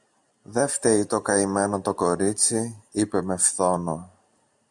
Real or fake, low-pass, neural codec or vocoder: real; 10.8 kHz; none